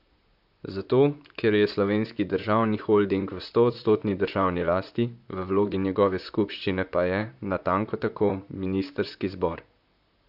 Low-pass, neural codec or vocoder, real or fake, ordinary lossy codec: 5.4 kHz; vocoder, 44.1 kHz, 128 mel bands, Pupu-Vocoder; fake; AAC, 48 kbps